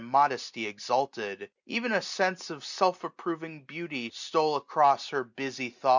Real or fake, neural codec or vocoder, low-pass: real; none; 7.2 kHz